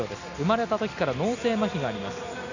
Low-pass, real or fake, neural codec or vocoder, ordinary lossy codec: 7.2 kHz; real; none; none